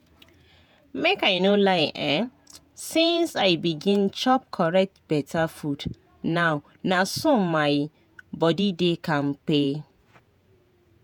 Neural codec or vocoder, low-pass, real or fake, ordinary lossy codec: vocoder, 48 kHz, 128 mel bands, Vocos; none; fake; none